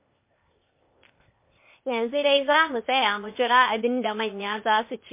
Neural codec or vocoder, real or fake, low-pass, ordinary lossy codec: codec, 16 kHz, 0.8 kbps, ZipCodec; fake; 3.6 kHz; MP3, 24 kbps